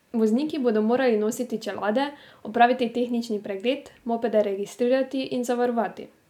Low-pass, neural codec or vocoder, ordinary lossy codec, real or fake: 19.8 kHz; none; none; real